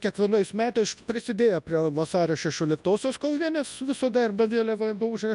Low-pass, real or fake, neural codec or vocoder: 10.8 kHz; fake; codec, 24 kHz, 0.9 kbps, WavTokenizer, large speech release